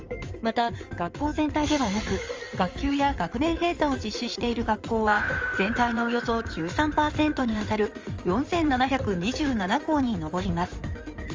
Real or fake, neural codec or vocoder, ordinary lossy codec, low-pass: fake; codec, 16 kHz in and 24 kHz out, 2.2 kbps, FireRedTTS-2 codec; Opus, 32 kbps; 7.2 kHz